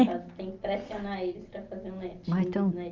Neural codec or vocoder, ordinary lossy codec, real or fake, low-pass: none; Opus, 24 kbps; real; 7.2 kHz